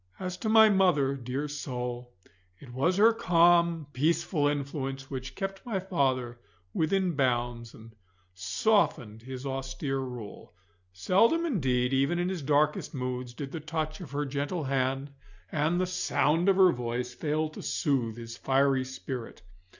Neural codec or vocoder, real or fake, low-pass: none; real; 7.2 kHz